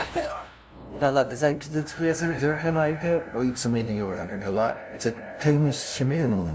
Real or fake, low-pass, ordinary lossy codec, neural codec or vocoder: fake; none; none; codec, 16 kHz, 0.5 kbps, FunCodec, trained on LibriTTS, 25 frames a second